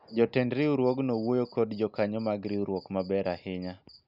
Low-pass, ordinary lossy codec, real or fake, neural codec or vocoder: 5.4 kHz; none; real; none